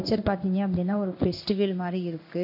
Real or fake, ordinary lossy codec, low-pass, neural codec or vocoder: fake; none; 5.4 kHz; codec, 16 kHz in and 24 kHz out, 1 kbps, XY-Tokenizer